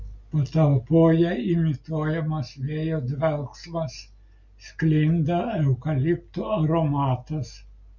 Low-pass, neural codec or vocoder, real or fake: 7.2 kHz; none; real